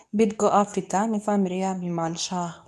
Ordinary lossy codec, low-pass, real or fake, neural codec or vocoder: none; none; fake; codec, 24 kHz, 0.9 kbps, WavTokenizer, medium speech release version 2